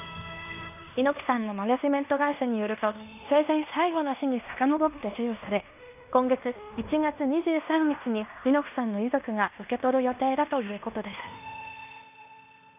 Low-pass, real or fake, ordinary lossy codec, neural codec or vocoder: 3.6 kHz; fake; none; codec, 16 kHz in and 24 kHz out, 0.9 kbps, LongCat-Audio-Codec, fine tuned four codebook decoder